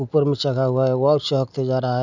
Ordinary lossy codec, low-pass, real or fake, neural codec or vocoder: none; 7.2 kHz; real; none